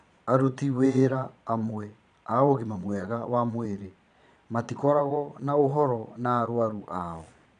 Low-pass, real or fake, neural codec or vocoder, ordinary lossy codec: 9.9 kHz; fake; vocoder, 22.05 kHz, 80 mel bands, Vocos; none